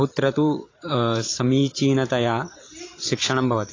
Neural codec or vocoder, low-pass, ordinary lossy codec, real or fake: vocoder, 44.1 kHz, 128 mel bands every 512 samples, BigVGAN v2; 7.2 kHz; AAC, 32 kbps; fake